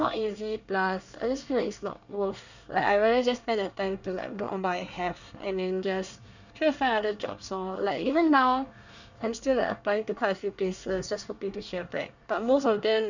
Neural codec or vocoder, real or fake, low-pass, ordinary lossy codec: codec, 24 kHz, 1 kbps, SNAC; fake; 7.2 kHz; none